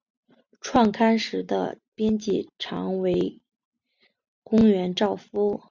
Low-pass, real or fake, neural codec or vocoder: 7.2 kHz; real; none